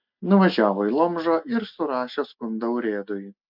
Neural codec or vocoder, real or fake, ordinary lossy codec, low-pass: none; real; MP3, 48 kbps; 5.4 kHz